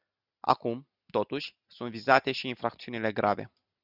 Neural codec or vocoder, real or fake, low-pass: none; real; 5.4 kHz